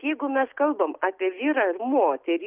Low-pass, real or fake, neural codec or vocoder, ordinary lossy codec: 3.6 kHz; real; none; Opus, 32 kbps